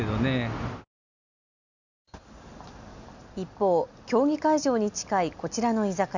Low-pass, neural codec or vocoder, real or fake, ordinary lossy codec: 7.2 kHz; none; real; none